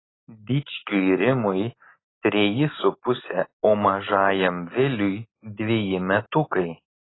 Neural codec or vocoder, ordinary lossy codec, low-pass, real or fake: none; AAC, 16 kbps; 7.2 kHz; real